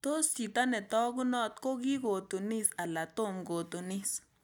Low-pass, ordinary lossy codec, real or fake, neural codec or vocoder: none; none; real; none